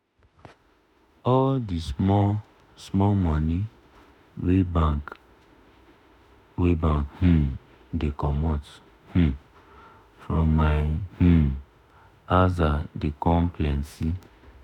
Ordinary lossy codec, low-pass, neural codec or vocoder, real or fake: none; 19.8 kHz; autoencoder, 48 kHz, 32 numbers a frame, DAC-VAE, trained on Japanese speech; fake